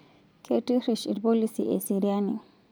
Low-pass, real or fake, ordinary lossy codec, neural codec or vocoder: none; real; none; none